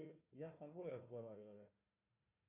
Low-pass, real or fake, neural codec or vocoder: 3.6 kHz; fake; codec, 16 kHz, 1 kbps, FunCodec, trained on Chinese and English, 50 frames a second